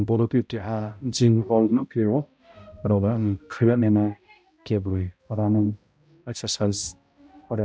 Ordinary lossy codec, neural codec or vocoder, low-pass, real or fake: none; codec, 16 kHz, 0.5 kbps, X-Codec, HuBERT features, trained on balanced general audio; none; fake